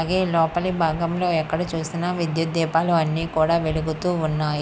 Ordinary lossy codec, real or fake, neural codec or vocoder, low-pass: none; real; none; none